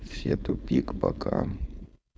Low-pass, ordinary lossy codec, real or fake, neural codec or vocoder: none; none; fake; codec, 16 kHz, 4.8 kbps, FACodec